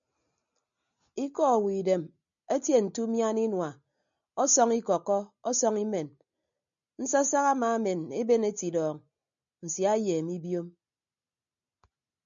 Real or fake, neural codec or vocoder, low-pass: real; none; 7.2 kHz